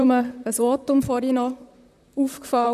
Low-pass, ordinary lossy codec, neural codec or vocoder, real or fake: 14.4 kHz; none; vocoder, 44.1 kHz, 128 mel bands, Pupu-Vocoder; fake